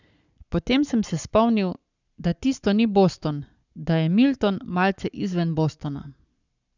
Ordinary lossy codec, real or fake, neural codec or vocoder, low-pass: none; fake; codec, 44.1 kHz, 7.8 kbps, Pupu-Codec; 7.2 kHz